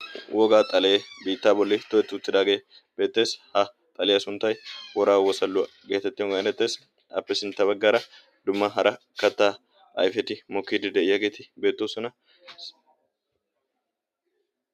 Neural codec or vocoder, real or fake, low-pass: none; real; 14.4 kHz